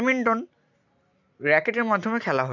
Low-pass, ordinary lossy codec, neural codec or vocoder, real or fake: 7.2 kHz; none; none; real